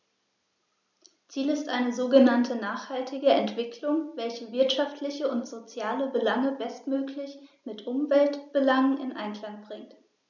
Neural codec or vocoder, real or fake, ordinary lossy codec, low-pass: none; real; none; 7.2 kHz